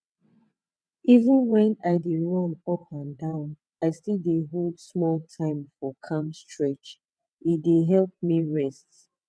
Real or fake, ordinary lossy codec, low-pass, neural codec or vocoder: fake; none; none; vocoder, 22.05 kHz, 80 mel bands, WaveNeXt